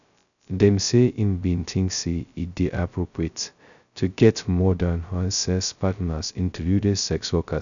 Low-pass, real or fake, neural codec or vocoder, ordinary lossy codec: 7.2 kHz; fake; codec, 16 kHz, 0.2 kbps, FocalCodec; none